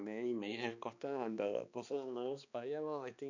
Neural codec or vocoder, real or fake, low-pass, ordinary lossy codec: codec, 16 kHz, 2 kbps, X-Codec, HuBERT features, trained on balanced general audio; fake; 7.2 kHz; none